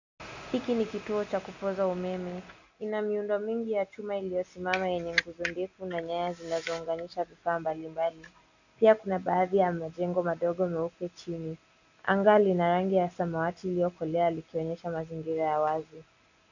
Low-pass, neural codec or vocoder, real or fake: 7.2 kHz; none; real